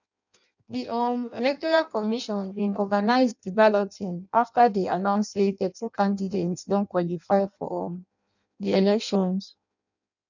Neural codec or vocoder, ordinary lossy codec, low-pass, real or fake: codec, 16 kHz in and 24 kHz out, 0.6 kbps, FireRedTTS-2 codec; none; 7.2 kHz; fake